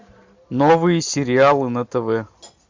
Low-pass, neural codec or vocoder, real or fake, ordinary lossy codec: 7.2 kHz; none; real; MP3, 64 kbps